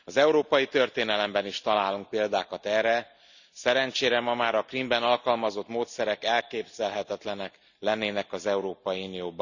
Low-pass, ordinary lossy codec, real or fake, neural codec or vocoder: 7.2 kHz; none; real; none